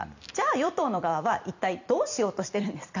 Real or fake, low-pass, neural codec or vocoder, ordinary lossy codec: fake; 7.2 kHz; vocoder, 44.1 kHz, 128 mel bands every 256 samples, BigVGAN v2; none